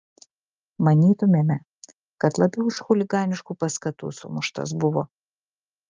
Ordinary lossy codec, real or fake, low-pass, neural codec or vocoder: Opus, 24 kbps; real; 7.2 kHz; none